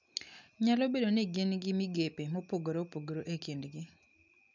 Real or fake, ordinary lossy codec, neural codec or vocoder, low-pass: real; none; none; 7.2 kHz